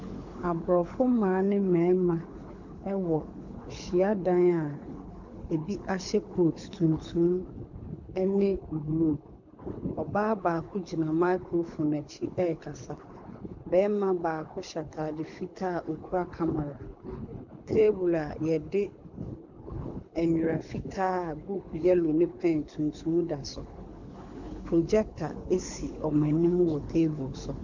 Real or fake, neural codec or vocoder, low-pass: fake; codec, 24 kHz, 6 kbps, HILCodec; 7.2 kHz